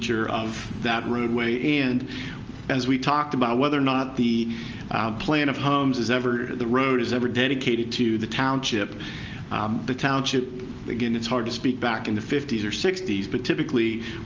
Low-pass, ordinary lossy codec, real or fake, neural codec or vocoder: 7.2 kHz; Opus, 24 kbps; real; none